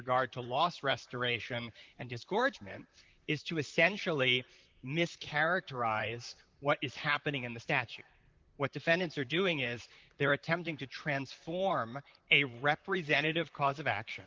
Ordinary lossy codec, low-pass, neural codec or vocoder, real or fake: Opus, 24 kbps; 7.2 kHz; vocoder, 44.1 kHz, 128 mel bands, Pupu-Vocoder; fake